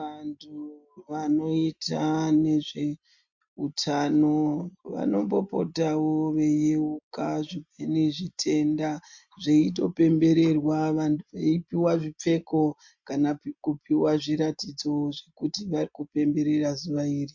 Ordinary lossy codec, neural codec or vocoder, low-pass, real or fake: MP3, 48 kbps; none; 7.2 kHz; real